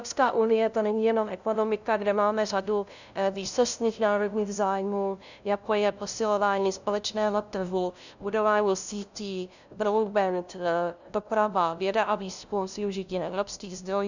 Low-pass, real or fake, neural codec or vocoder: 7.2 kHz; fake; codec, 16 kHz, 0.5 kbps, FunCodec, trained on LibriTTS, 25 frames a second